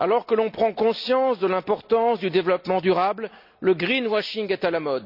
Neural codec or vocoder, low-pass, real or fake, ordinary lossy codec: none; 5.4 kHz; real; none